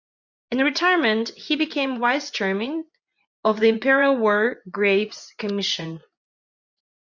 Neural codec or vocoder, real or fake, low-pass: vocoder, 24 kHz, 100 mel bands, Vocos; fake; 7.2 kHz